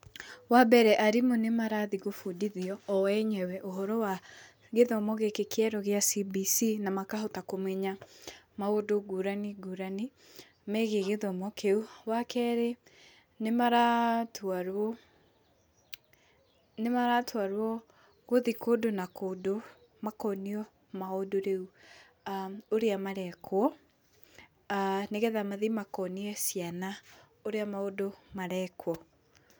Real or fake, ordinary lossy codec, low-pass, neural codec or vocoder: real; none; none; none